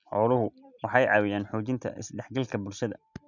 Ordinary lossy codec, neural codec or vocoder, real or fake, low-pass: none; none; real; 7.2 kHz